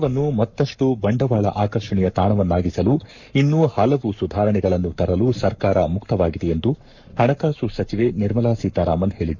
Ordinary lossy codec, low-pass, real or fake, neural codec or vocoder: none; 7.2 kHz; fake; codec, 44.1 kHz, 7.8 kbps, Pupu-Codec